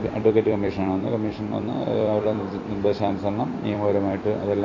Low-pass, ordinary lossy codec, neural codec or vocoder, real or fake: 7.2 kHz; AAC, 32 kbps; vocoder, 44.1 kHz, 128 mel bands every 256 samples, BigVGAN v2; fake